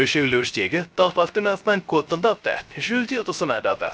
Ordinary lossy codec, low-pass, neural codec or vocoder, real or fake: none; none; codec, 16 kHz, 0.3 kbps, FocalCodec; fake